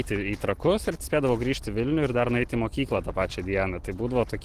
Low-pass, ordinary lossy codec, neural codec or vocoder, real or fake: 14.4 kHz; Opus, 16 kbps; none; real